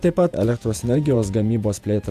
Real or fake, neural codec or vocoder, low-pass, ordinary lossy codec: fake; vocoder, 48 kHz, 128 mel bands, Vocos; 14.4 kHz; AAC, 96 kbps